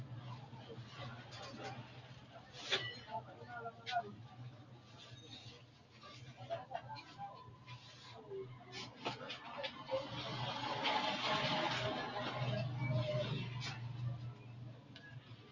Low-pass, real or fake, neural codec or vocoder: 7.2 kHz; real; none